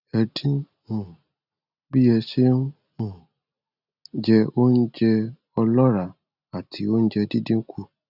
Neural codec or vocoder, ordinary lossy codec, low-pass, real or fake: none; none; 5.4 kHz; real